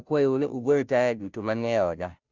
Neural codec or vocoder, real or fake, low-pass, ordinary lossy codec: codec, 16 kHz, 0.5 kbps, FunCodec, trained on Chinese and English, 25 frames a second; fake; 7.2 kHz; Opus, 64 kbps